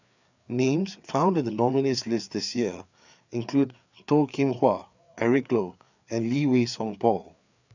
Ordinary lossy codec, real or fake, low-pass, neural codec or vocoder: none; fake; 7.2 kHz; codec, 16 kHz, 4 kbps, FreqCodec, larger model